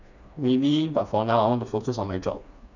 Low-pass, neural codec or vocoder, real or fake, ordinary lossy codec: 7.2 kHz; codec, 16 kHz, 2 kbps, FreqCodec, smaller model; fake; none